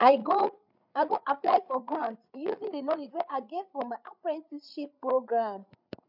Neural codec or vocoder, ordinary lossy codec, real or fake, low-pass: codec, 16 kHz, 8 kbps, FreqCodec, larger model; none; fake; 5.4 kHz